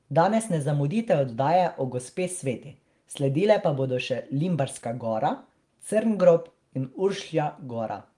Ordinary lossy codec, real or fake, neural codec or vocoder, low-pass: Opus, 24 kbps; real; none; 10.8 kHz